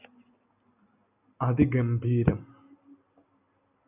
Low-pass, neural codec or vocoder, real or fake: 3.6 kHz; none; real